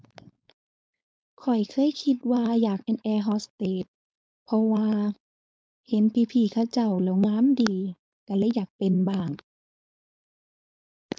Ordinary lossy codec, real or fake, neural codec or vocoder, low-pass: none; fake; codec, 16 kHz, 4.8 kbps, FACodec; none